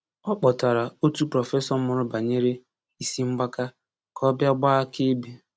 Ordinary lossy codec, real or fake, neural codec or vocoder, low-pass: none; real; none; none